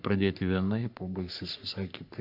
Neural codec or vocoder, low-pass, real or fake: codec, 44.1 kHz, 3.4 kbps, Pupu-Codec; 5.4 kHz; fake